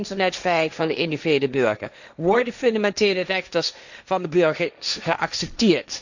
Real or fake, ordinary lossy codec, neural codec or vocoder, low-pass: fake; none; codec, 16 kHz, 1.1 kbps, Voila-Tokenizer; 7.2 kHz